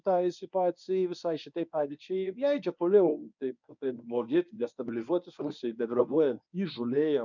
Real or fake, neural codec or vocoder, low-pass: fake; codec, 24 kHz, 0.9 kbps, WavTokenizer, medium speech release version 1; 7.2 kHz